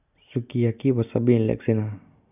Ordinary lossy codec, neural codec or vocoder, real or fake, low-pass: none; none; real; 3.6 kHz